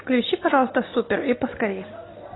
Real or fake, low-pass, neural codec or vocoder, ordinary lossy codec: real; 7.2 kHz; none; AAC, 16 kbps